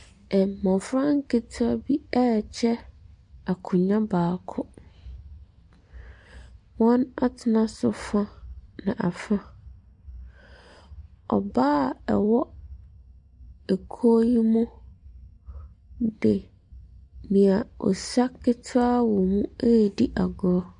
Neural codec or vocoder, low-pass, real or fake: none; 10.8 kHz; real